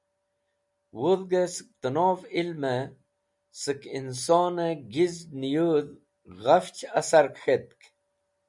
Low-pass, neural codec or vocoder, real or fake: 10.8 kHz; none; real